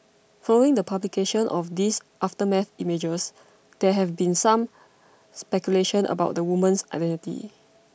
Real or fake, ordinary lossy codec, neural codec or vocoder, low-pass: real; none; none; none